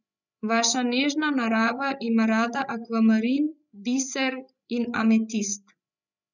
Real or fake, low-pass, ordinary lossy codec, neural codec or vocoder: fake; 7.2 kHz; none; codec, 16 kHz, 16 kbps, FreqCodec, larger model